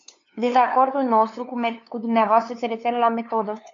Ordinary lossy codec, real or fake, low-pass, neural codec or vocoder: MP3, 48 kbps; fake; 7.2 kHz; codec, 16 kHz, 2 kbps, FunCodec, trained on LibriTTS, 25 frames a second